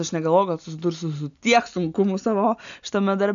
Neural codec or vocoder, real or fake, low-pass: none; real; 7.2 kHz